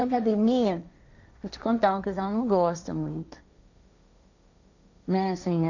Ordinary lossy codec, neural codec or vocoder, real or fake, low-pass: none; codec, 16 kHz, 1.1 kbps, Voila-Tokenizer; fake; 7.2 kHz